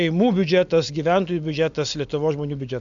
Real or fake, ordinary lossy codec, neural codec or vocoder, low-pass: real; MP3, 64 kbps; none; 7.2 kHz